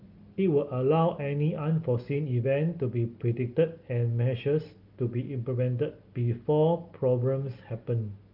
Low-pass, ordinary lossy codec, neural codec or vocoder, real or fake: 5.4 kHz; Opus, 32 kbps; none; real